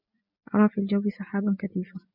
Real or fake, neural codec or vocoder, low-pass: real; none; 5.4 kHz